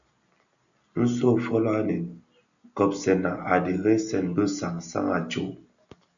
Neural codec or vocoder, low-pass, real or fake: none; 7.2 kHz; real